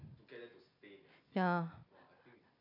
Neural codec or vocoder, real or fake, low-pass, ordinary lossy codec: none; real; 5.4 kHz; none